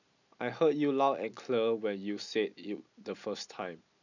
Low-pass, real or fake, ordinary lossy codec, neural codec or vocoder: 7.2 kHz; real; none; none